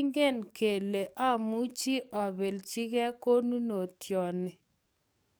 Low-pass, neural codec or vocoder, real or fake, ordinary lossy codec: none; codec, 44.1 kHz, 7.8 kbps, DAC; fake; none